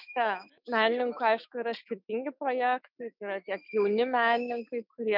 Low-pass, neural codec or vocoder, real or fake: 5.4 kHz; autoencoder, 48 kHz, 128 numbers a frame, DAC-VAE, trained on Japanese speech; fake